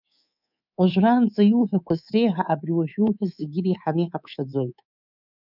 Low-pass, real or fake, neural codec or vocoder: 5.4 kHz; fake; codec, 24 kHz, 3.1 kbps, DualCodec